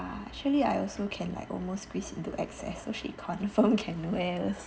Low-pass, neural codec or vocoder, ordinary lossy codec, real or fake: none; none; none; real